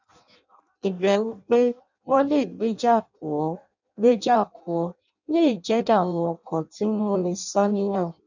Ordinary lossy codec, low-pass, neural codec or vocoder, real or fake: none; 7.2 kHz; codec, 16 kHz in and 24 kHz out, 0.6 kbps, FireRedTTS-2 codec; fake